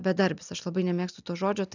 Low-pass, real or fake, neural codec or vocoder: 7.2 kHz; real; none